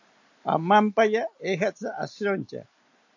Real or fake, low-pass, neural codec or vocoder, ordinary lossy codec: real; 7.2 kHz; none; AAC, 48 kbps